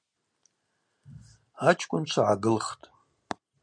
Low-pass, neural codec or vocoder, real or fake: 9.9 kHz; none; real